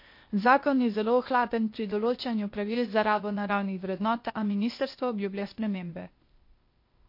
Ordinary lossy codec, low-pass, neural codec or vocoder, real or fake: MP3, 32 kbps; 5.4 kHz; codec, 16 kHz, 0.8 kbps, ZipCodec; fake